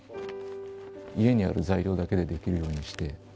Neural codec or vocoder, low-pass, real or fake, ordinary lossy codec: none; none; real; none